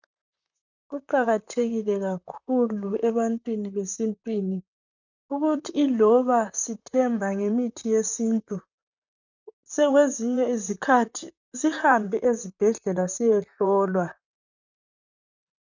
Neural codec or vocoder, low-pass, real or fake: vocoder, 44.1 kHz, 128 mel bands, Pupu-Vocoder; 7.2 kHz; fake